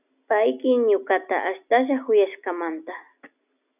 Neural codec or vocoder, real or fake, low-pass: none; real; 3.6 kHz